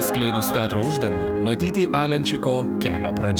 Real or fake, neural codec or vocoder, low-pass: fake; codec, 44.1 kHz, 2.6 kbps, DAC; 19.8 kHz